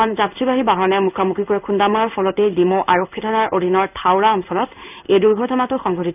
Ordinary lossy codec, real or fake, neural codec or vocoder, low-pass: none; fake; codec, 16 kHz in and 24 kHz out, 1 kbps, XY-Tokenizer; 3.6 kHz